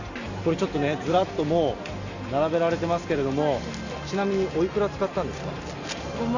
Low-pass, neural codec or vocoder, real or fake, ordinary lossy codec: 7.2 kHz; none; real; none